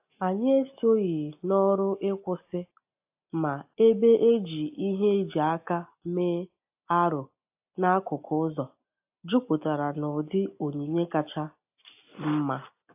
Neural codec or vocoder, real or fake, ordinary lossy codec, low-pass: none; real; AAC, 32 kbps; 3.6 kHz